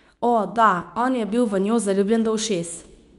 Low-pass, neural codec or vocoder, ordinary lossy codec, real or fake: 10.8 kHz; codec, 24 kHz, 0.9 kbps, WavTokenizer, medium speech release version 2; none; fake